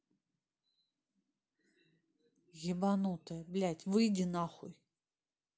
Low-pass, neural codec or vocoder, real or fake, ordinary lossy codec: none; none; real; none